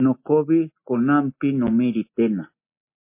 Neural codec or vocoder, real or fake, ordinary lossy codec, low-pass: none; real; MP3, 24 kbps; 3.6 kHz